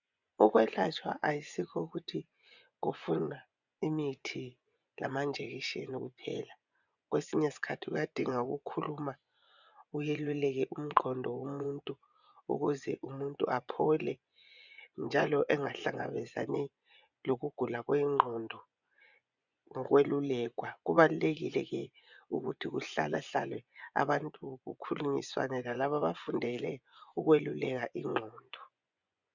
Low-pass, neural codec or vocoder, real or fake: 7.2 kHz; vocoder, 24 kHz, 100 mel bands, Vocos; fake